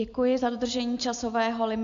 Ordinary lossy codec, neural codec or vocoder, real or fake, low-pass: AAC, 64 kbps; none; real; 7.2 kHz